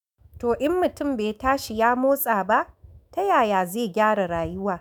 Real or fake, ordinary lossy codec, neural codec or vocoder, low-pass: fake; none; autoencoder, 48 kHz, 128 numbers a frame, DAC-VAE, trained on Japanese speech; none